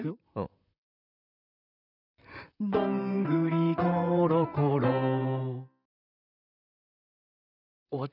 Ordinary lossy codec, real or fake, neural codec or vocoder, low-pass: none; fake; vocoder, 44.1 kHz, 128 mel bands, Pupu-Vocoder; 5.4 kHz